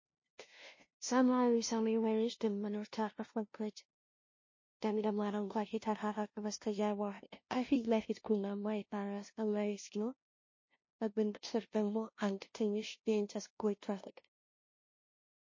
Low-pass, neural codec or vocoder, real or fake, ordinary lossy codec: 7.2 kHz; codec, 16 kHz, 0.5 kbps, FunCodec, trained on LibriTTS, 25 frames a second; fake; MP3, 32 kbps